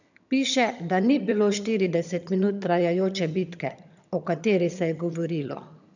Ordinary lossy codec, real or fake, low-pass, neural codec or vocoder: none; fake; 7.2 kHz; vocoder, 22.05 kHz, 80 mel bands, HiFi-GAN